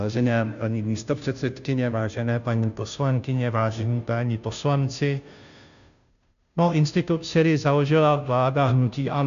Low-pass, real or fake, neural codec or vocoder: 7.2 kHz; fake; codec, 16 kHz, 0.5 kbps, FunCodec, trained on Chinese and English, 25 frames a second